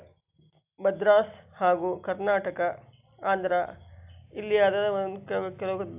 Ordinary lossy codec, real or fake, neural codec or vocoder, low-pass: AAC, 32 kbps; real; none; 3.6 kHz